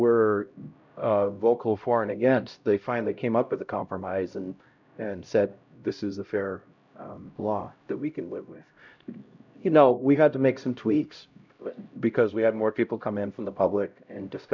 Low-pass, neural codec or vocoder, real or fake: 7.2 kHz; codec, 16 kHz, 0.5 kbps, X-Codec, HuBERT features, trained on LibriSpeech; fake